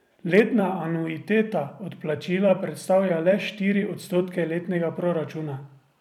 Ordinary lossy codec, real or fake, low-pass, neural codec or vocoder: none; fake; 19.8 kHz; vocoder, 44.1 kHz, 128 mel bands every 256 samples, BigVGAN v2